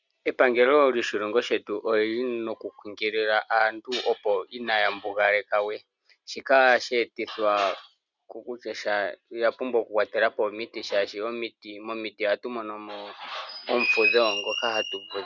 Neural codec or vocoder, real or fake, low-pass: none; real; 7.2 kHz